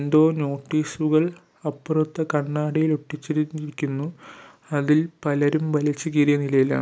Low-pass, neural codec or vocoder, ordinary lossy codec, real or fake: none; none; none; real